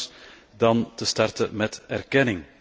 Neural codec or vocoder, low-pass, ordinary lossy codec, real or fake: none; none; none; real